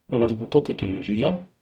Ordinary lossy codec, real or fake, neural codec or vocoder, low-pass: none; fake; codec, 44.1 kHz, 0.9 kbps, DAC; 19.8 kHz